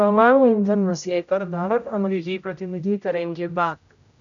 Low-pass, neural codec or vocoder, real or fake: 7.2 kHz; codec, 16 kHz, 0.5 kbps, X-Codec, HuBERT features, trained on general audio; fake